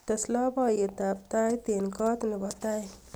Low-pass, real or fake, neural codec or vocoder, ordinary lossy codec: none; real; none; none